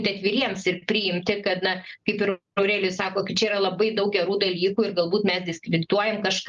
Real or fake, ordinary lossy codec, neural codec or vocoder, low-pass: real; Opus, 32 kbps; none; 7.2 kHz